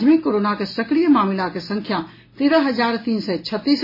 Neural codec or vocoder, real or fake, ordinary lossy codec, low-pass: none; real; MP3, 24 kbps; 5.4 kHz